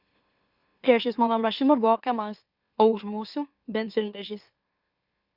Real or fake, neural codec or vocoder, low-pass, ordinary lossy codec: fake; autoencoder, 44.1 kHz, a latent of 192 numbers a frame, MeloTTS; 5.4 kHz; Opus, 64 kbps